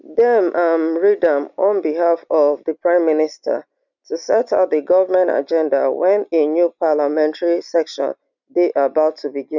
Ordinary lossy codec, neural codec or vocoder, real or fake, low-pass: none; none; real; 7.2 kHz